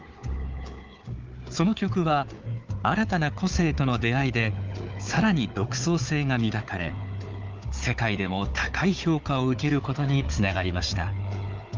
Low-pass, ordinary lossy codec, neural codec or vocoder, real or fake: 7.2 kHz; Opus, 24 kbps; codec, 16 kHz, 4 kbps, FunCodec, trained on Chinese and English, 50 frames a second; fake